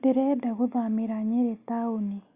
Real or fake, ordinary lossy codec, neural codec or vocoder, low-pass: real; Opus, 64 kbps; none; 3.6 kHz